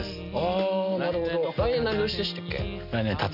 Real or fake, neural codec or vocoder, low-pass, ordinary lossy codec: real; none; 5.4 kHz; none